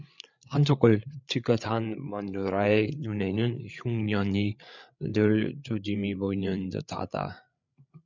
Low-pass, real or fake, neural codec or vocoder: 7.2 kHz; fake; codec, 16 kHz, 8 kbps, FreqCodec, larger model